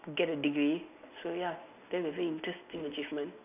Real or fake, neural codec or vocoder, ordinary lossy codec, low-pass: real; none; none; 3.6 kHz